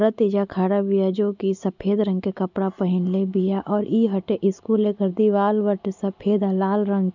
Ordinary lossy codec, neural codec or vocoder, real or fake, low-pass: none; none; real; 7.2 kHz